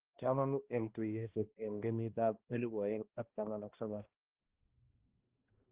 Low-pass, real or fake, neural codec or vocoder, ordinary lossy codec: 3.6 kHz; fake; codec, 16 kHz, 1 kbps, X-Codec, HuBERT features, trained on balanced general audio; Opus, 16 kbps